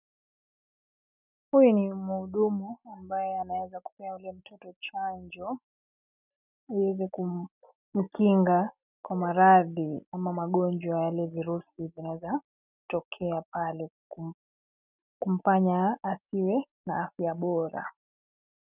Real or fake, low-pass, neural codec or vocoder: real; 3.6 kHz; none